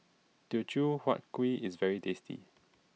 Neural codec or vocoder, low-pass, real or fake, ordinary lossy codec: none; none; real; none